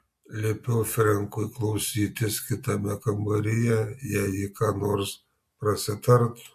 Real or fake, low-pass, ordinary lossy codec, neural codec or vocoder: real; 14.4 kHz; MP3, 64 kbps; none